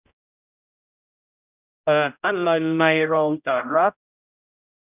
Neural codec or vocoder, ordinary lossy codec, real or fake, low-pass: codec, 16 kHz, 0.5 kbps, X-Codec, HuBERT features, trained on general audio; none; fake; 3.6 kHz